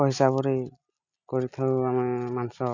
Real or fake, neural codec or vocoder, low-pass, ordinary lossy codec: real; none; 7.2 kHz; none